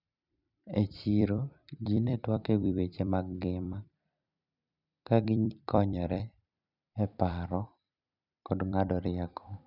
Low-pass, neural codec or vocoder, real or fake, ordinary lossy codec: 5.4 kHz; vocoder, 44.1 kHz, 128 mel bands every 256 samples, BigVGAN v2; fake; none